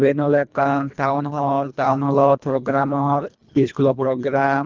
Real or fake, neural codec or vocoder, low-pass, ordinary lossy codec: fake; codec, 24 kHz, 1.5 kbps, HILCodec; 7.2 kHz; Opus, 32 kbps